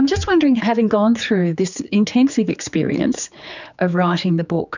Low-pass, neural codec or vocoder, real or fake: 7.2 kHz; codec, 16 kHz, 4 kbps, X-Codec, HuBERT features, trained on general audio; fake